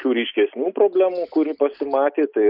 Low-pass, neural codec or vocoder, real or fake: 5.4 kHz; none; real